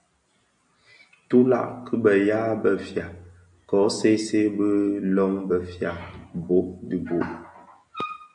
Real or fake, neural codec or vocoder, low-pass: real; none; 9.9 kHz